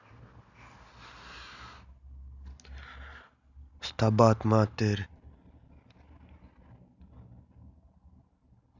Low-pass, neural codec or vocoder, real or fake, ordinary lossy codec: 7.2 kHz; none; real; none